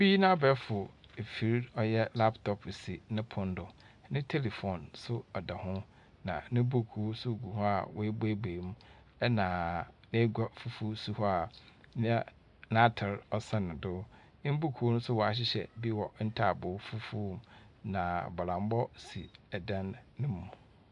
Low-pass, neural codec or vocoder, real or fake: 10.8 kHz; none; real